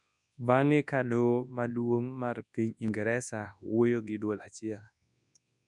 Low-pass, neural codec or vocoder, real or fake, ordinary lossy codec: 10.8 kHz; codec, 24 kHz, 0.9 kbps, WavTokenizer, large speech release; fake; none